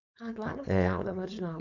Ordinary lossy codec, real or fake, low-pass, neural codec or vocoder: none; fake; 7.2 kHz; codec, 16 kHz, 4.8 kbps, FACodec